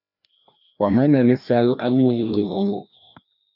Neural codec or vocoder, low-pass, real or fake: codec, 16 kHz, 1 kbps, FreqCodec, larger model; 5.4 kHz; fake